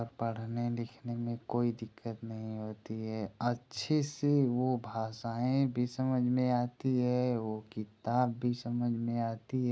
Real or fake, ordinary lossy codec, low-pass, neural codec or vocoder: real; none; none; none